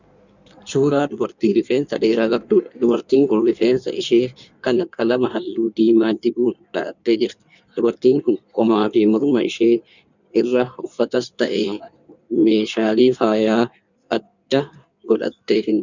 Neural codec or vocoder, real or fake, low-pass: codec, 16 kHz in and 24 kHz out, 1.1 kbps, FireRedTTS-2 codec; fake; 7.2 kHz